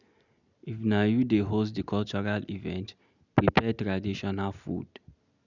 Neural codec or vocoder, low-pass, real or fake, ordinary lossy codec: none; 7.2 kHz; real; none